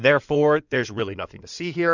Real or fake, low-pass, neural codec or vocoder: fake; 7.2 kHz; codec, 16 kHz in and 24 kHz out, 2.2 kbps, FireRedTTS-2 codec